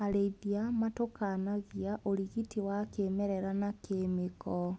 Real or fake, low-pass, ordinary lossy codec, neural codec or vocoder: real; none; none; none